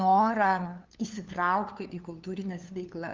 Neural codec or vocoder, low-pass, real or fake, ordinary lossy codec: codec, 16 kHz, 2 kbps, FunCodec, trained on LibriTTS, 25 frames a second; 7.2 kHz; fake; Opus, 24 kbps